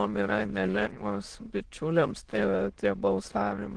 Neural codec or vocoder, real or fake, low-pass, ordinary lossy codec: autoencoder, 22.05 kHz, a latent of 192 numbers a frame, VITS, trained on many speakers; fake; 9.9 kHz; Opus, 16 kbps